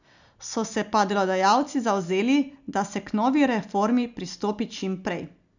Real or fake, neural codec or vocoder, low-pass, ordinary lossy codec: real; none; 7.2 kHz; none